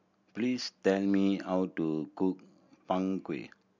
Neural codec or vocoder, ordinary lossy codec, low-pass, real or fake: none; none; 7.2 kHz; real